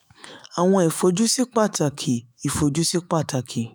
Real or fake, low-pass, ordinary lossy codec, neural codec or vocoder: fake; none; none; autoencoder, 48 kHz, 128 numbers a frame, DAC-VAE, trained on Japanese speech